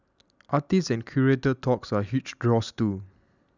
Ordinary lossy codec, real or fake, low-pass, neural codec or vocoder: none; real; 7.2 kHz; none